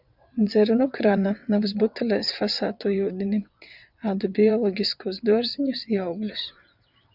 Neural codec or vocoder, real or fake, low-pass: vocoder, 22.05 kHz, 80 mel bands, WaveNeXt; fake; 5.4 kHz